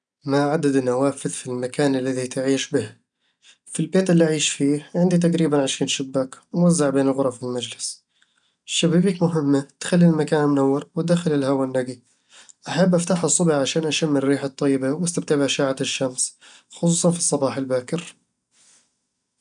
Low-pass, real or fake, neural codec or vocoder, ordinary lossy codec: 9.9 kHz; real; none; none